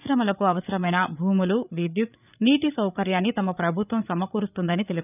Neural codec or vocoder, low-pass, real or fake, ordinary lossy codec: codec, 16 kHz, 8 kbps, FreqCodec, larger model; 3.6 kHz; fake; none